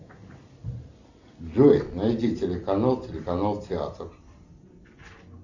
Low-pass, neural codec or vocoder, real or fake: 7.2 kHz; none; real